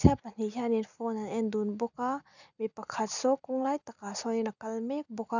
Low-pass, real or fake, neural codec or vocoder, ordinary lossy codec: 7.2 kHz; real; none; none